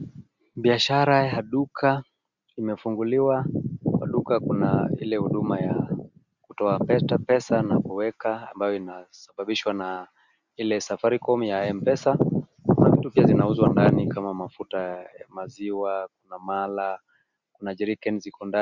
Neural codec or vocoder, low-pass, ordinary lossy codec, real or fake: none; 7.2 kHz; Opus, 64 kbps; real